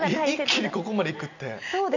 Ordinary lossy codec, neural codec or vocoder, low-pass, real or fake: none; none; 7.2 kHz; real